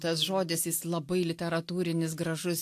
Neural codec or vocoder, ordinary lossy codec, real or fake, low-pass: none; AAC, 64 kbps; real; 14.4 kHz